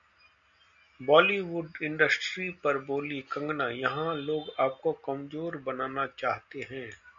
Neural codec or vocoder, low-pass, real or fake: none; 7.2 kHz; real